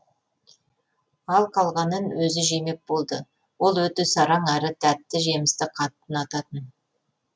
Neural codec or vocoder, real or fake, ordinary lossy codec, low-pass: none; real; none; none